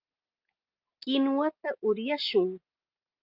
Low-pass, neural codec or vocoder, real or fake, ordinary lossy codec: 5.4 kHz; none; real; Opus, 32 kbps